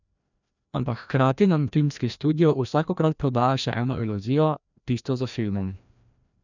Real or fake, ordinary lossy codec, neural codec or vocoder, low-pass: fake; none; codec, 16 kHz, 1 kbps, FreqCodec, larger model; 7.2 kHz